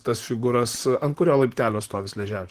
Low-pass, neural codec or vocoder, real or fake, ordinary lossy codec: 14.4 kHz; vocoder, 44.1 kHz, 128 mel bands, Pupu-Vocoder; fake; Opus, 16 kbps